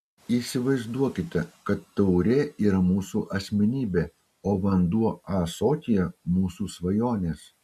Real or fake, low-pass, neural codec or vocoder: real; 14.4 kHz; none